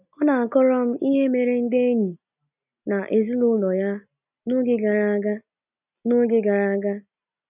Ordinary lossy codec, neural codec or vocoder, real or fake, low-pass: none; none; real; 3.6 kHz